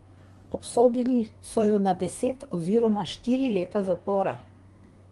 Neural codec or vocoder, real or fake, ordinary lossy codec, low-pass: codec, 24 kHz, 1 kbps, SNAC; fake; Opus, 32 kbps; 10.8 kHz